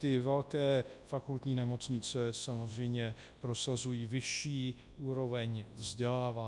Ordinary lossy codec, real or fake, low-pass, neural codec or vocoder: MP3, 64 kbps; fake; 10.8 kHz; codec, 24 kHz, 0.9 kbps, WavTokenizer, large speech release